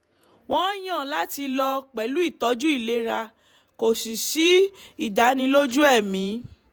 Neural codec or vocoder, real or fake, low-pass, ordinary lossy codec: vocoder, 48 kHz, 128 mel bands, Vocos; fake; none; none